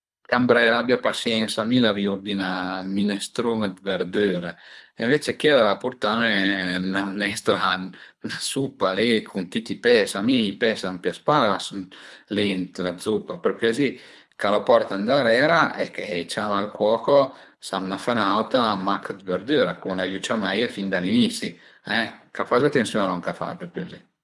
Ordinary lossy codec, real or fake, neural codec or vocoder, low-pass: none; fake; codec, 24 kHz, 3 kbps, HILCodec; none